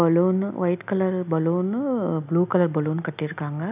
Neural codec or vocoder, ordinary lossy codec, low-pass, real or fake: none; none; 3.6 kHz; real